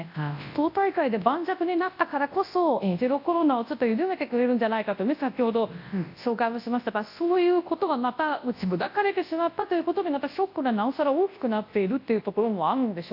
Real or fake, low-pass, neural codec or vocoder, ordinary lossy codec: fake; 5.4 kHz; codec, 24 kHz, 0.9 kbps, WavTokenizer, large speech release; AAC, 32 kbps